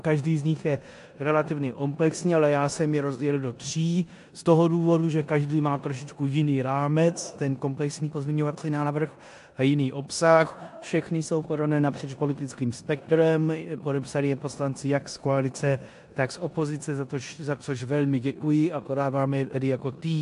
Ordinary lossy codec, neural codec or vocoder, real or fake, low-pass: AAC, 64 kbps; codec, 16 kHz in and 24 kHz out, 0.9 kbps, LongCat-Audio-Codec, four codebook decoder; fake; 10.8 kHz